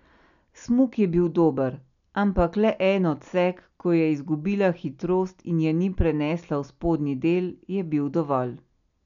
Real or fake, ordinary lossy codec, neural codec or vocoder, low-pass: real; none; none; 7.2 kHz